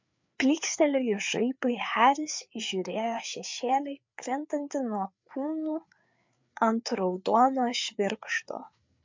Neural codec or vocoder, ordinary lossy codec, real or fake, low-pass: codec, 16 kHz, 4 kbps, FreqCodec, larger model; MP3, 64 kbps; fake; 7.2 kHz